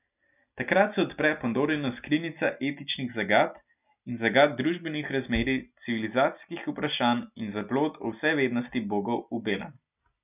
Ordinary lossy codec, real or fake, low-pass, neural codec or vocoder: none; real; 3.6 kHz; none